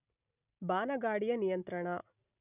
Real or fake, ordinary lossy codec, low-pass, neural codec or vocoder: real; none; 3.6 kHz; none